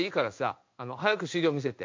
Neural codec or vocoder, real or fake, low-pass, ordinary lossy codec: codec, 16 kHz in and 24 kHz out, 1 kbps, XY-Tokenizer; fake; 7.2 kHz; MP3, 48 kbps